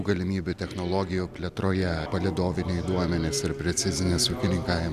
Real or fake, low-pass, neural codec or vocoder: real; 14.4 kHz; none